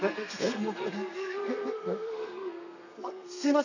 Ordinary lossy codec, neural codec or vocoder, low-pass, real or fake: none; codec, 32 kHz, 1.9 kbps, SNAC; 7.2 kHz; fake